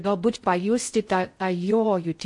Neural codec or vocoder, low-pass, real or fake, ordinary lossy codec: codec, 16 kHz in and 24 kHz out, 0.6 kbps, FocalCodec, streaming, 4096 codes; 10.8 kHz; fake; MP3, 48 kbps